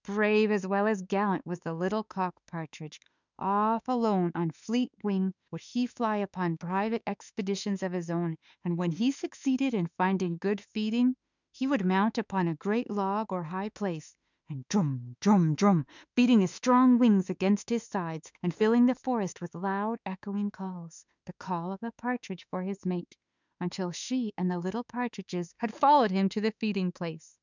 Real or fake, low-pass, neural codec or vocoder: fake; 7.2 kHz; autoencoder, 48 kHz, 32 numbers a frame, DAC-VAE, trained on Japanese speech